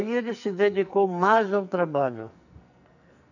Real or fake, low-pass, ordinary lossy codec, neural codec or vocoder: fake; 7.2 kHz; none; codec, 44.1 kHz, 2.6 kbps, SNAC